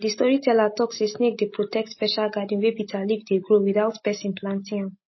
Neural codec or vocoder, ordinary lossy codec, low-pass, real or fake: none; MP3, 24 kbps; 7.2 kHz; real